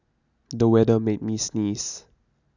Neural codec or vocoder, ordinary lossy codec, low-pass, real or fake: none; none; 7.2 kHz; real